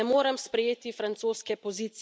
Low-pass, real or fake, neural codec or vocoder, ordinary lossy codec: none; real; none; none